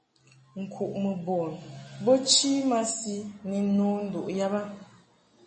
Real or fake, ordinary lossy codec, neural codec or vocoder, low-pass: real; MP3, 32 kbps; none; 10.8 kHz